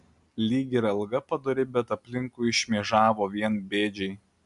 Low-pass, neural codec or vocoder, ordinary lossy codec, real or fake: 10.8 kHz; none; MP3, 96 kbps; real